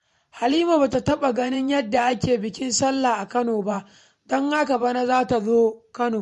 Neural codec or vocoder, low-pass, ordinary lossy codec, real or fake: vocoder, 44.1 kHz, 128 mel bands every 512 samples, BigVGAN v2; 14.4 kHz; MP3, 48 kbps; fake